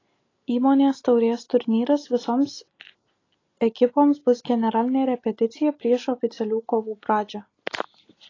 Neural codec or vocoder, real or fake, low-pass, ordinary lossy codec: none; real; 7.2 kHz; AAC, 32 kbps